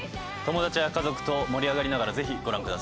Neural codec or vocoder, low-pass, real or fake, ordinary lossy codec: none; none; real; none